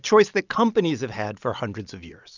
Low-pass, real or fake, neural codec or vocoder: 7.2 kHz; real; none